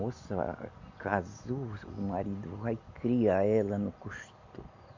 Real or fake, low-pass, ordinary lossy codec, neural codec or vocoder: real; 7.2 kHz; MP3, 64 kbps; none